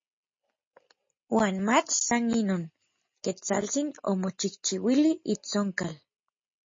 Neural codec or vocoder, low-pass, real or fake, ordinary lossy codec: none; 7.2 kHz; real; MP3, 32 kbps